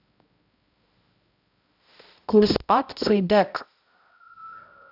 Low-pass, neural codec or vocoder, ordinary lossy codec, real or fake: 5.4 kHz; codec, 16 kHz, 0.5 kbps, X-Codec, HuBERT features, trained on balanced general audio; AAC, 48 kbps; fake